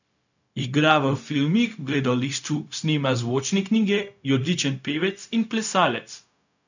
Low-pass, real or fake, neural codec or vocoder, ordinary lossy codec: 7.2 kHz; fake; codec, 16 kHz, 0.4 kbps, LongCat-Audio-Codec; none